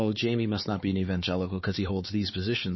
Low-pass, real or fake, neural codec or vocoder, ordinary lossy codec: 7.2 kHz; fake; codec, 16 kHz, 4 kbps, X-Codec, HuBERT features, trained on LibriSpeech; MP3, 24 kbps